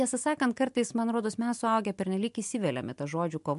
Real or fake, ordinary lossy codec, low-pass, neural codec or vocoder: real; MP3, 64 kbps; 10.8 kHz; none